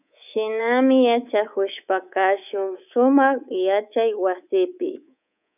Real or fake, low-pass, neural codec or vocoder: fake; 3.6 kHz; codec, 24 kHz, 3.1 kbps, DualCodec